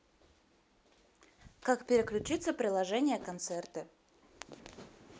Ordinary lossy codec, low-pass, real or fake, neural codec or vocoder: none; none; real; none